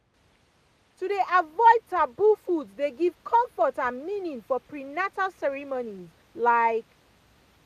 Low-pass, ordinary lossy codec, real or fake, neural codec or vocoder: 14.4 kHz; none; real; none